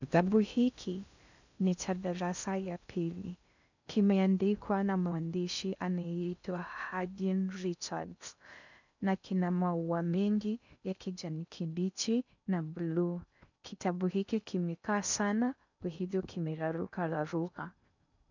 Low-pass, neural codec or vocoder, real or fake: 7.2 kHz; codec, 16 kHz in and 24 kHz out, 0.6 kbps, FocalCodec, streaming, 2048 codes; fake